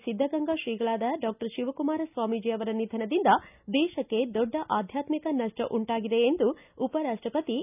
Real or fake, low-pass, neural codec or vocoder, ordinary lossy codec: real; 3.6 kHz; none; none